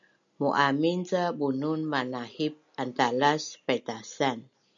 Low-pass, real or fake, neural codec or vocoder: 7.2 kHz; real; none